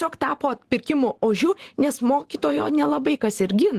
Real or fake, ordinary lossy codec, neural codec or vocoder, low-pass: fake; Opus, 16 kbps; vocoder, 44.1 kHz, 128 mel bands every 512 samples, BigVGAN v2; 14.4 kHz